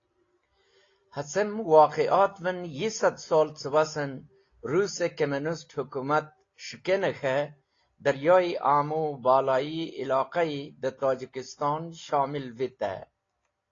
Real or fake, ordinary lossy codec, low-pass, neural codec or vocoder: real; AAC, 32 kbps; 7.2 kHz; none